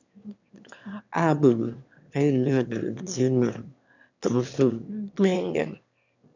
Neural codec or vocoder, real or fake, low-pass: autoencoder, 22.05 kHz, a latent of 192 numbers a frame, VITS, trained on one speaker; fake; 7.2 kHz